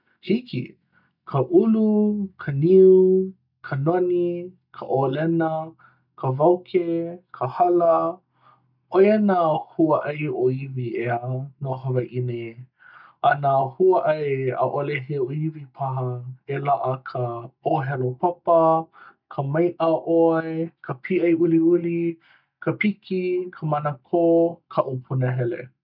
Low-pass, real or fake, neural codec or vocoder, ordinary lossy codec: 5.4 kHz; real; none; none